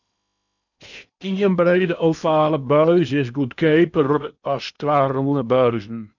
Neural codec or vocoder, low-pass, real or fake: codec, 16 kHz in and 24 kHz out, 0.8 kbps, FocalCodec, streaming, 65536 codes; 7.2 kHz; fake